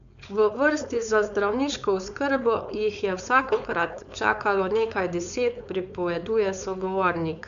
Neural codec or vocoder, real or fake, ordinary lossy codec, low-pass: codec, 16 kHz, 4.8 kbps, FACodec; fake; none; 7.2 kHz